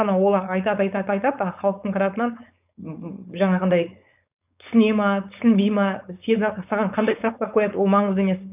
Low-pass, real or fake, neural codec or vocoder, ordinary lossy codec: 3.6 kHz; fake; codec, 16 kHz, 4.8 kbps, FACodec; none